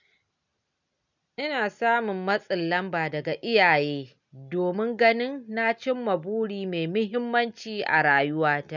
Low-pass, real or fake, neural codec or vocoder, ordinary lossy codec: 7.2 kHz; real; none; none